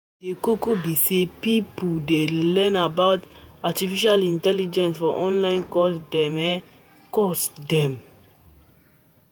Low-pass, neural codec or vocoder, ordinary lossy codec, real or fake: none; vocoder, 48 kHz, 128 mel bands, Vocos; none; fake